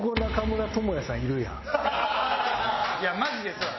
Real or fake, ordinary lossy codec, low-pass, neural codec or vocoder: real; MP3, 24 kbps; 7.2 kHz; none